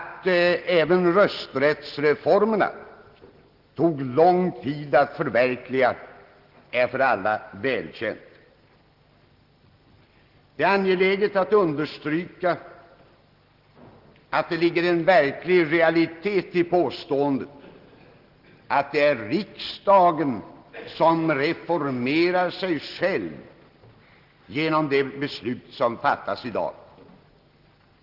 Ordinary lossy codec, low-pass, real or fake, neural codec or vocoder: Opus, 16 kbps; 5.4 kHz; real; none